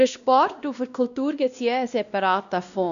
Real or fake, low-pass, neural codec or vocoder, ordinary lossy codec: fake; 7.2 kHz; codec, 16 kHz, 1 kbps, X-Codec, WavLM features, trained on Multilingual LibriSpeech; none